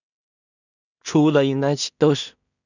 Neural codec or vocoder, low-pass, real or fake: codec, 16 kHz in and 24 kHz out, 0.4 kbps, LongCat-Audio-Codec, two codebook decoder; 7.2 kHz; fake